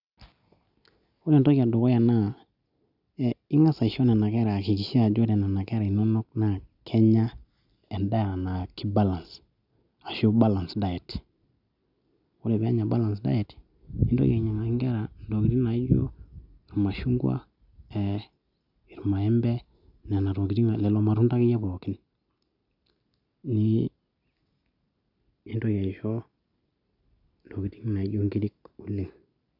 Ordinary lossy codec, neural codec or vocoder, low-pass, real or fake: none; none; 5.4 kHz; real